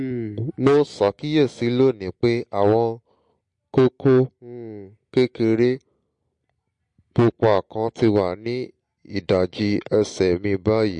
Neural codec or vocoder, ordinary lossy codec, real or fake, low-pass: none; MP3, 48 kbps; real; 9.9 kHz